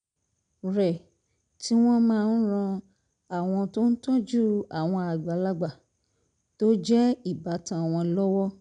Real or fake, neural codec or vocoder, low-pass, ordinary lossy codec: real; none; 9.9 kHz; none